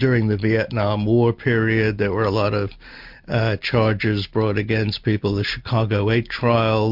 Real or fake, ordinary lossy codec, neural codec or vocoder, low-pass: real; MP3, 48 kbps; none; 5.4 kHz